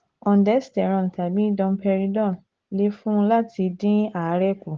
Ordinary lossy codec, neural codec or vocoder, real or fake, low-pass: Opus, 16 kbps; none; real; 7.2 kHz